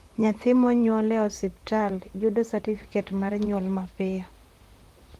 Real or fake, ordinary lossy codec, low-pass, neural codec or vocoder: fake; Opus, 24 kbps; 14.4 kHz; vocoder, 44.1 kHz, 128 mel bands, Pupu-Vocoder